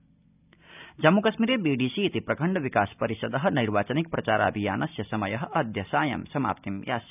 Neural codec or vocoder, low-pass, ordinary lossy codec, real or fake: none; 3.6 kHz; none; real